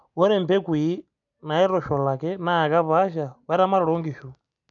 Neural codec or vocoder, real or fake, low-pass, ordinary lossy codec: none; real; 7.2 kHz; none